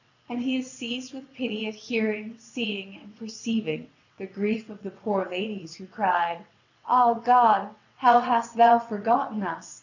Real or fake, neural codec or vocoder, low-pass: fake; vocoder, 22.05 kHz, 80 mel bands, WaveNeXt; 7.2 kHz